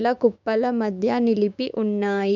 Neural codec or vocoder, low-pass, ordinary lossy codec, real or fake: codec, 16 kHz, 6 kbps, DAC; 7.2 kHz; none; fake